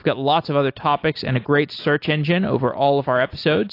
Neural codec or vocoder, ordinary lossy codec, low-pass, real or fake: none; AAC, 32 kbps; 5.4 kHz; real